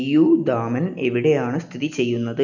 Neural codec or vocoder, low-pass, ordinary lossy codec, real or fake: none; 7.2 kHz; none; real